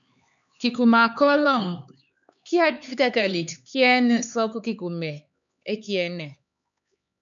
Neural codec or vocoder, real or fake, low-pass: codec, 16 kHz, 4 kbps, X-Codec, HuBERT features, trained on LibriSpeech; fake; 7.2 kHz